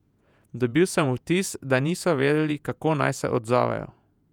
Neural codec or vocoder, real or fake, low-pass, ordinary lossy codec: vocoder, 48 kHz, 128 mel bands, Vocos; fake; 19.8 kHz; none